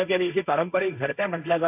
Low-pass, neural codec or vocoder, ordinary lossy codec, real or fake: 3.6 kHz; codec, 16 kHz, 1.1 kbps, Voila-Tokenizer; AAC, 24 kbps; fake